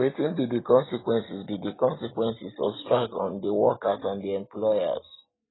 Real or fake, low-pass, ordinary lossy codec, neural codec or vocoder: real; 7.2 kHz; AAC, 16 kbps; none